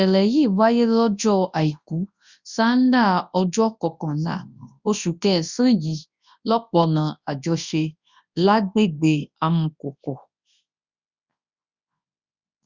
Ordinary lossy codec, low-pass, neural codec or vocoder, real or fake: Opus, 64 kbps; 7.2 kHz; codec, 24 kHz, 0.9 kbps, WavTokenizer, large speech release; fake